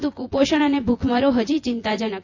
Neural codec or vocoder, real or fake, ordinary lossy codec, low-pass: vocoder, 24 kHz, 100 mel bands, Vocos; fake; AAC, 48 kbps; 7.2 kHz